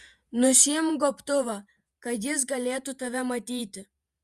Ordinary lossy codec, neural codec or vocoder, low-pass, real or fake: Opus, 64 kbps; vocoder, 48 kHz, 128 mel bands, Vocos; 14.4 kHz; fake